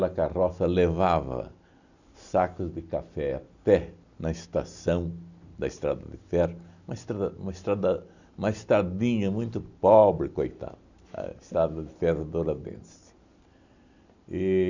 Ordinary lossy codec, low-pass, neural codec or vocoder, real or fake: none; 7.2 kHz; none; real